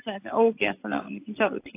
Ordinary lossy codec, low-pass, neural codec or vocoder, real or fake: AAC, 24 kbps; 3.6 kHz; none; real